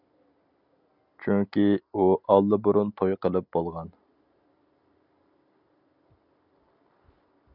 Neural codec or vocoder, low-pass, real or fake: none; 5.4 kHz; real